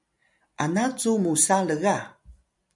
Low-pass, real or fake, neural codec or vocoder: 10.8 kHz; real; none